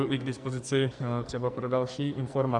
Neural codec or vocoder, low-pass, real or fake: codec, 44.1 kHz, 2.6 kbps, SNAC; 10.8 kHz; fake